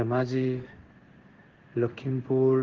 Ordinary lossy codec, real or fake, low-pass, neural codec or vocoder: Opus, 16 kbps; fake; 7.2 kHz; codec, 16 kHz in and 24 kHz out, 1 kbps, XY-Tokenizer